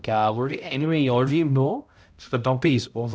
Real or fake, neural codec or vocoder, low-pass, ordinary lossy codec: fake; codec, 16 kHz, 0.5 kbps, X-Codec, HuBERT features, trained on balanced general audio; none; none